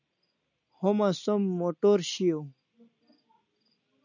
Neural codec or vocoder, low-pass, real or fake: none; 7.2 kHz; real